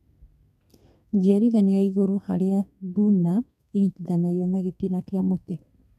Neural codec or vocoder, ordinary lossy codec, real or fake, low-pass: codec, 32 kHz, 1.9 kbps, SNAC; none; fake; 14.4 kHz